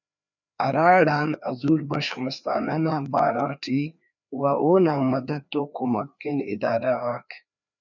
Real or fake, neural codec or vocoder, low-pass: fake; codec, 16 kHz, 2 kbps, FreqCodec, larger model; 7.2 kHz